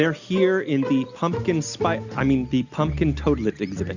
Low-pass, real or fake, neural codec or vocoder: 7.2 kHz; real; none